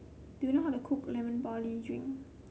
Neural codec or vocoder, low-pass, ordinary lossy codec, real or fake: none; none; none; real